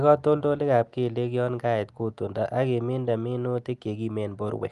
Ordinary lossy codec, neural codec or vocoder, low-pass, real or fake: Opus, 32 kbps; none; 10.8 kHz; real